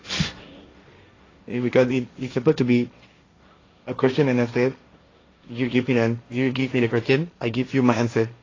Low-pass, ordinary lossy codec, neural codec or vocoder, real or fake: 7.2 kHz; AAC, 32 kbps; codec, 16 kHz, 1.1 kbps, Voila-Tokenizer; fake